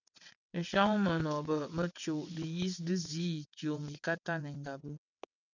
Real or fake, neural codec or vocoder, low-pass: fake; vocoder, 22.05 kHz, 80 mel bands, WaveNeXt; 7.2 kHz